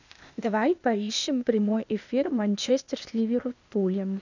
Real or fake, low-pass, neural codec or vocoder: fake; 7.2 kHz; codec, 16 kHz, 0.8 kbps, ZipCodec